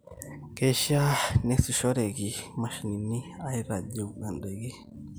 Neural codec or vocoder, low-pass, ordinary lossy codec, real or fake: none; none; none; real